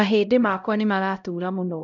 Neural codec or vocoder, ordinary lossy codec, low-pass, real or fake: codec, 16 kHz, 0.5 kbps, X-Codec, HuBERT features, trained on LibriSpeech; none; 7.2 kHz; fake